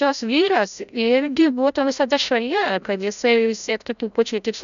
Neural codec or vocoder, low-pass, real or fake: codec, 16 kHz, 0.5 kbps, FreqCodec, larger model; 7.2 kHz; fake